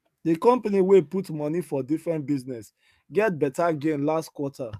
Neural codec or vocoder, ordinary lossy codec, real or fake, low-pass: codec, 44.1 kHz, 7.8 kbps, DAC; MP3, 96 kbps; fake; 14.4 kHz